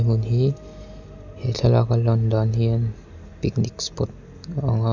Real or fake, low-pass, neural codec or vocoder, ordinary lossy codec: real; 7.2 kHz; none; none